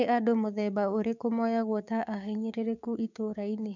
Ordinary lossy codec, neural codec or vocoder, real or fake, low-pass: none; codec, 16 kHz, 6 kbps, DAC; fake; 7.2 kHz